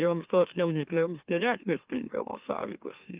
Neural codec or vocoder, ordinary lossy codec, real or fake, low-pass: autoencoder, 44.1 kHz, a latent of 192 numbers a frame, MeloTTS; Opus, 24 kbps; fake; 3.6 kHz